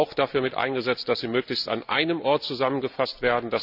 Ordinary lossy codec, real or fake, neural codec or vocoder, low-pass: none; real; none; 5.4 kHz